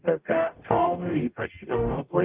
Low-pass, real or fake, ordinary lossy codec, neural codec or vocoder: 3.6 kHz; fake; Opus, 32 kbps; codec, 44.1 kHz, 0.9 kbps, DAC